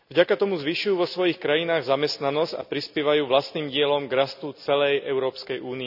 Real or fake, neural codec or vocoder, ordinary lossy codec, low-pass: real; none; none; 5.4 kHz